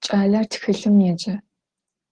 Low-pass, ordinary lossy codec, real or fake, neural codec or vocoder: 9.9 kHz; Opus, 16 kbps; real; none